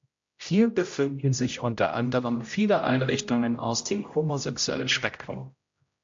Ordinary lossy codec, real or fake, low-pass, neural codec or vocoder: MP3, 48 kbps; fake; 7.2 kHz; codec, 16 kHz, 0.5 kbps, X-Codec, HuBERT features, trained on general audio